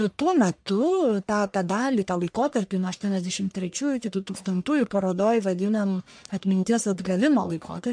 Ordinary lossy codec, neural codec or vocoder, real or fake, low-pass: MP3, 64 kbps; codec, 44.1 kHz, 1.7 kbps, Pupu-Codec; fake; 9.9 kHz